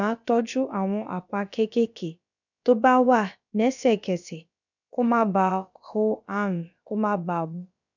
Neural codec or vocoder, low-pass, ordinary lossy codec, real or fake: codec, 16 kHz, about 1 kbps, DyCAST, with the encoder's durations; 7.2 kHz; none; fake